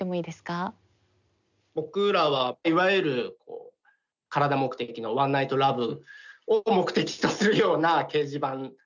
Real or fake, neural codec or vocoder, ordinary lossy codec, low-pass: real; none; none; 7.2 kHz